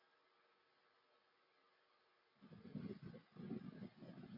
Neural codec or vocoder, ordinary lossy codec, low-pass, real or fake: none; AAC, 24 kbps; 5.4 kHz; real